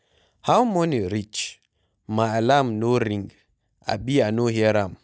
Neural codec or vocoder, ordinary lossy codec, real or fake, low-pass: none; none; real; none